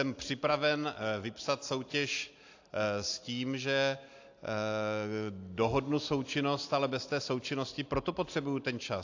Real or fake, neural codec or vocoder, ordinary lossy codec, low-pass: real; none; AAC, 48 kbps; 7.2 kHz